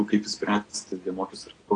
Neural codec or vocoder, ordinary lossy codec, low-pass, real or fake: none; AAC, 32 kbps; 9.9 kHz; real